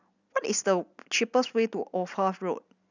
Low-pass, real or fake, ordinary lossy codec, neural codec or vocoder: 7.2 kHz; real; none; none